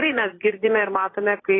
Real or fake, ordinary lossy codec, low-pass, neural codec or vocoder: fake; AAC, 16 kbps; 7.2 kHz; vocoder, 44.1 kHz, 80 mel bands, Vocos